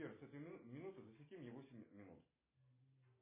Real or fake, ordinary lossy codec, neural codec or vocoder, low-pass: real; MP3, 16 kbps; none; 3.6 kHz